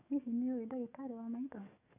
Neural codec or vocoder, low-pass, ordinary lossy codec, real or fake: codec, 44.1 kHz, 7.8 kbps, DAC; 3.6 kHz; none; fake